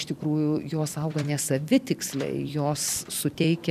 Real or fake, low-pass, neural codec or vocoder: fake; 14.4 kHz; vocoder, 44.1 kHz, 128 mel bands every 256 samples, BigVGAN v2